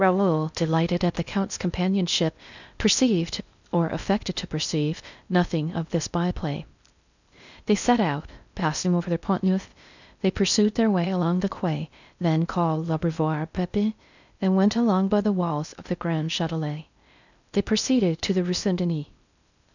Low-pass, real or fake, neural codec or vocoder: 7.2 kHz; fake; codec, 16 kHz in and 24 kHz out, 0.6 kbps, FocalCodec, streaming, 4096 codes